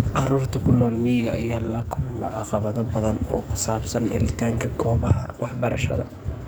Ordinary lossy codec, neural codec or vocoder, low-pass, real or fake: none; codec, 44.1 kHz, 2.6 kbps, SNAC; none; fake